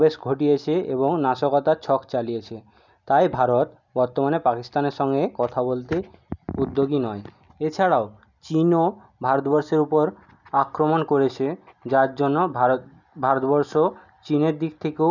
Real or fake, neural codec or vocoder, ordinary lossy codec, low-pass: real; none; none; 7.2 kHz